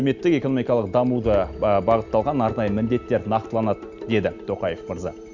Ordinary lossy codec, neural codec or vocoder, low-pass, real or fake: none; none; 7.2 kHz; real